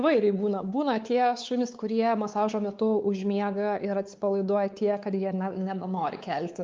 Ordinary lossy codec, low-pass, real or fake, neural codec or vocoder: Opus, 24 kbps; 7.2 kHz; fake; codec, 16 kHz, 4 kbps, X-Codec, WavLM features, trained on Multilingual LibriSpeech